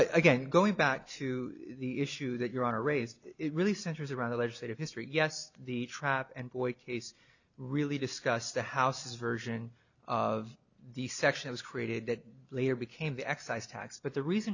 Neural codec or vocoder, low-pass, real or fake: none; 7.2 kHz; real